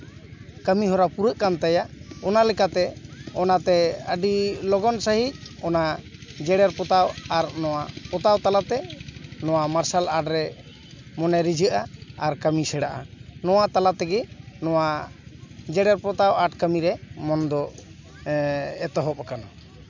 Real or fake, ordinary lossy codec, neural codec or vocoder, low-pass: real; MP3, 64 kbps; none; 7.2 kHz